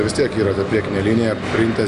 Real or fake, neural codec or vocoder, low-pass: real; none; 10.8 kHz